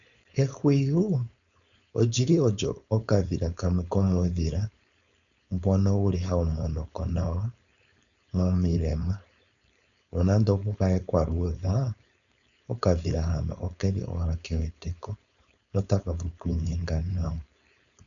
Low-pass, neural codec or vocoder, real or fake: 7.2 kHz; codec, 16 kHz, 4.8 kbps, FACodec; fake